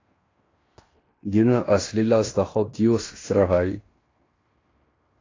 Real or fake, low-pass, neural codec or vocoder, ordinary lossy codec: fake; 7.2 kHz; codec, 16 kHz in and 24 kHz out, 0.9 kbps, LongCat-Audio-Codec, fine tuned four codebook decoder; AAC, 32 kbps